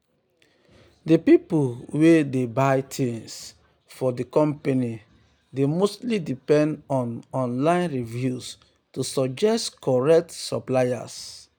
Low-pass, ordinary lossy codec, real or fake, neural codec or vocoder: none; none; real; none